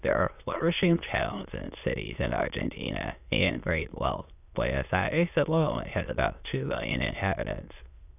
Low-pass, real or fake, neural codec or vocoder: 3.6 kHz; fake; autoencoder, 22.05 kHz, a latent of 192 numbers a frame, VITS, trained on many speakers